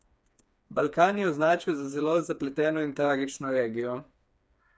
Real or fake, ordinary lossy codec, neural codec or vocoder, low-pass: fake; none; codec, 16 kHz, 4 kbps, FreqCodec, smaller model; none